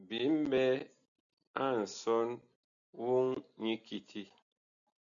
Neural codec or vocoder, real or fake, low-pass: none; real; 7.2 kHz